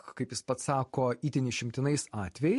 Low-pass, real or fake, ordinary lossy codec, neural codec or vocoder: 14.4 kHz; real; MP3, 48 kbps; none